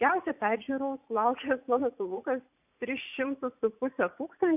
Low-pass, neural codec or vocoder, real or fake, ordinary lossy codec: 3.6 kHz; vocoder, 44.1 kHz, 80 mel bands, Vocos; fake; AAC, 32 kbps